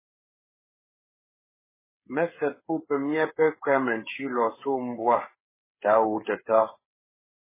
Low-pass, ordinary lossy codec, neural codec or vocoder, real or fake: 3.6 kHz; MP3, 16 kbps; codec, 16 kHz, 8 kbps, FreqCodec, smaller model; fake